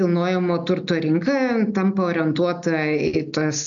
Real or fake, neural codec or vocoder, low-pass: real; none; 7.2 kHz